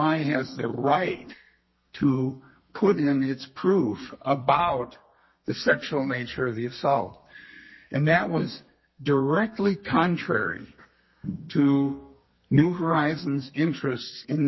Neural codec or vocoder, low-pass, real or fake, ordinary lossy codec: codec, 32 kHz, 1.9 kbps, SNAC; 7.2 kHz; fake; MP3, 24 kbps